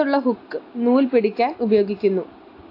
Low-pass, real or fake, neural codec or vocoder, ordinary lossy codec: 5.4 kHz; real; none; AAC, 32 kbps